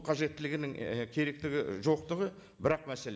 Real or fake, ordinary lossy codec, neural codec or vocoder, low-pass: real; none; none; none